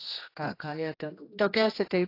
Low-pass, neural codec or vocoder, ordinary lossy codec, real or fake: 5.4 kHz; codec, 16 kHz, 1 kbps, X-Codec, HuBERT features, trained on general audio; AAC, 32 kbps; fake